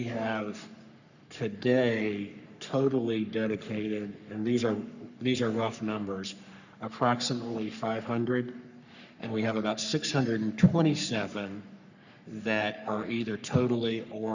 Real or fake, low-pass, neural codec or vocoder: fake; 7.2 kHz; codec, 44.1 kHz, 3.4 kbps, Pupu-Codec